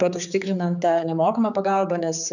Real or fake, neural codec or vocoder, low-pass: fake; codec, 16 kHz, 4 kbps, X-Codec, HuBERT features, trained on general audio; 7.2 kHz